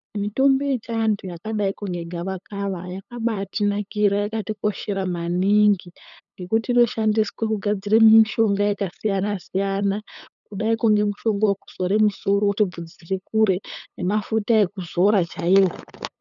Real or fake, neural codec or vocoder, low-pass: fake; codec, 16 kHz, 8 kbps, FunCodec, trained on LibriTTS, 25 frames a second; 7.2 kHz